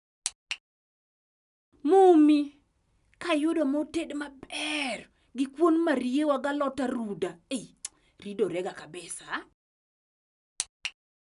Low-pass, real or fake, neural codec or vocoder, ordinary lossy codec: 10.8 kHz; real; none; none